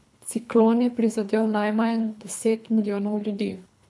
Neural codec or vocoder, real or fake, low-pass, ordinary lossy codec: codec, 24 kHz, 3 kbps, HILCodec; fake; none; none